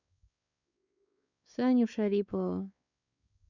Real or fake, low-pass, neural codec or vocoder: fake; 7.2 kHz; codec, 16 kHz, 4 kbps, X-Codec, WavLM features, trained on Multilingual LibriSpeech